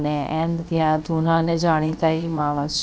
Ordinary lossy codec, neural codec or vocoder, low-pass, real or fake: none; codec, 16 kHz, about 1 kbps, DyCAST, with the encoder's durations; none; fake